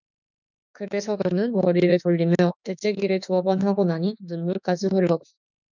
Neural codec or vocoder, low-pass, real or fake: autoencoder, 48 kHz, 32 numbers a frame, DAC-VAE, trained on Japanese speech; 7.2 kHz; fake